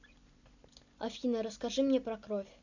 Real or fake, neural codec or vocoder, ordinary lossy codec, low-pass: real; none; none; 7.2 kHz